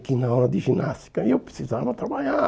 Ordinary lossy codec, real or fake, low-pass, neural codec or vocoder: none; real; none; none